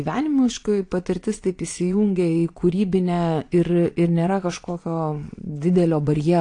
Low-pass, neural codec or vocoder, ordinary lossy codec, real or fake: 9.9 kHz; vocoder, 22.05 kHz, 80 mel bands, Vocos; AAC, 48 kbps; fake